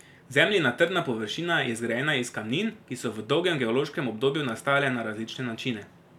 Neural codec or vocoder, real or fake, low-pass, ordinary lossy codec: none; real; 19.8 kHz; none